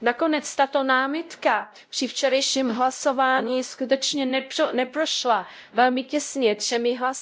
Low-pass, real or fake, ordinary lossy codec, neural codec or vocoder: none; fake; none; codec, 16 kHz, 0.5 kbps, X-Codec, WavLM features, trained on Multilingual LibriSpeech